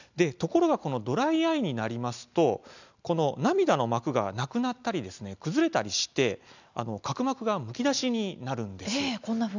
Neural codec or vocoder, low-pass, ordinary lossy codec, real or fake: none; 7.2 kHz; none; real